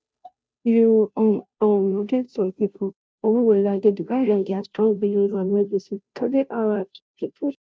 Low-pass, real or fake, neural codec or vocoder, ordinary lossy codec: none; fake; codec, 16 kHz, 0.5 kbps, FunCodec, trained on Chinese and English, 25 frames a second; none